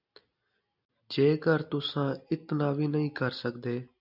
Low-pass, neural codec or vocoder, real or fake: 5.4 kHz; none; real